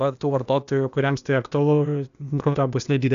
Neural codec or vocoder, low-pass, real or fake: codec, 16 kHz, 0.8 kbps, ZipCodec; 7.2 kHz; fake